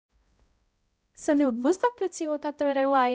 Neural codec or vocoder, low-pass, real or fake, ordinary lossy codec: codec, 16 kHz, 0.5 kbps, X-Codec, HuBERT features, trained on balanced general audio; none; fake; none